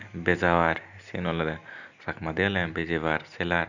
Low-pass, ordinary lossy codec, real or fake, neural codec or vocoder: 7.2 kHz; none; real; none